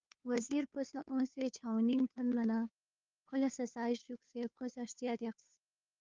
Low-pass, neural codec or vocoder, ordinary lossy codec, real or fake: 7.2 kHz; codec, 16 kHz, 2 kbps, FunCodec, trained on Chinese and English, 25 frames a second; Opus, 32 kbps; fake